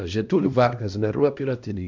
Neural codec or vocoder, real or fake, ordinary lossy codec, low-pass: codec, 16 kHz, 1 kbps, X-Codec, HuBERT features, trained on LibriSpeech; fake; MP3, 64 kbps; 7.2 kHz